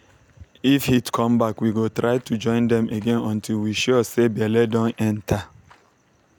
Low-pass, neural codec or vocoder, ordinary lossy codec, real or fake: 19.8 kHz; none; none; real